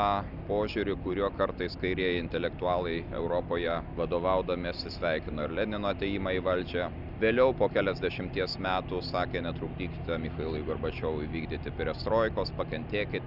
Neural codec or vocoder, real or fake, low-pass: none; real; 5.4 kHz